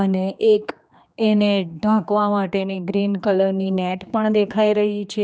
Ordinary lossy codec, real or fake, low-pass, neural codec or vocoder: none; fake; none; codec, 16 kHz, 2 kbps, X-Codec, HuBERT features, trained on general audio